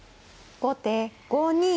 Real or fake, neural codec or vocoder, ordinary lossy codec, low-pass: real; none; none; none